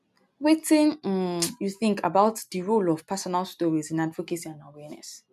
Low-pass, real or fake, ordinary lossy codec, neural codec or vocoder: 14.4 kHz; real; MP3, 96 kbps; none